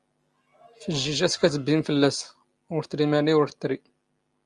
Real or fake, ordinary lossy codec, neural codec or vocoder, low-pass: real; Opus, 32 kbps; none; 10.8 kHz